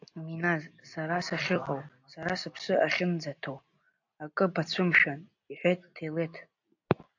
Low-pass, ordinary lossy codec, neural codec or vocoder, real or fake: 7.2 kHz; AAC, 48 kbps; none; real